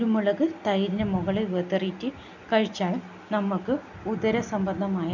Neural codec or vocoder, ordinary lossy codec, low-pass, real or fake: none; none; 7.2 kHz; real